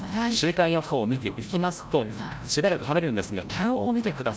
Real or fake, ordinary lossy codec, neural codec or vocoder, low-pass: fake; none; codec, 16 kHz, 0.5 kbps, FreqCodec, larger model; none